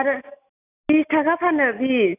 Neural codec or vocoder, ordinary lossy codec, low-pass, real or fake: none; none; 3.6 kHz; real